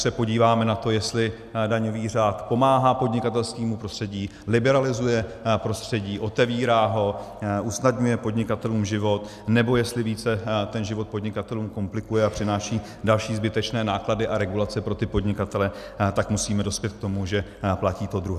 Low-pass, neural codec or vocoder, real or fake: 14.4 kHz; none; real